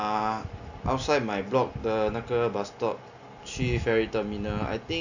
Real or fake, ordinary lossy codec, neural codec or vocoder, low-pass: real; none; none; 7.2 kHz